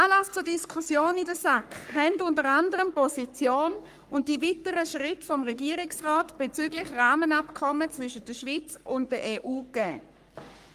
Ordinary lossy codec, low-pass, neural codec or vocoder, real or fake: Opus, 32 kbps; 14.4 kHz; codec, 44.1 kHz, 3.4 kbps, Pupu-Codec; fake